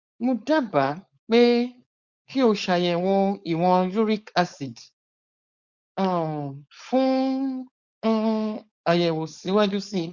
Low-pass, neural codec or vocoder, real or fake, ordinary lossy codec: 7.2 kHz; codec, 16 kHz, 4.8 kbps, FACodec; fake; Opus, 64 kbps